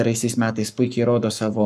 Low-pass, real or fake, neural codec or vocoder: 14.4 kHz; fake; codec, 44.1 kHz, 7.8 kbps, Pupu-Codec